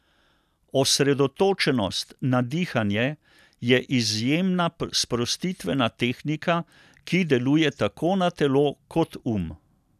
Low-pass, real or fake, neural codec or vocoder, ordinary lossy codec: 14.4 kHz; real; none; none